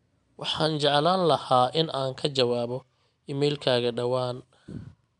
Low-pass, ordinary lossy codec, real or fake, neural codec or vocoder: 10.8 kHz; none; real; none